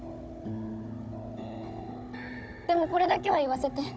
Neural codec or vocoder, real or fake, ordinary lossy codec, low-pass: codec, 16 kHz, 16 kbps, FunCodec, trained on Chinese and English, 50 frames a second; fake; none; none